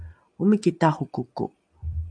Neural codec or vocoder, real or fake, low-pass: none; real; 9.9 kHz